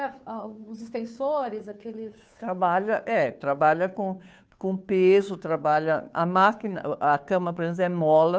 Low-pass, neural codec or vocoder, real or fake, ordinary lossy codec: none; codec, 16 kHz, 2 kbps, FunCodec, trained on Chinese and English, 25 frames a second; fake; none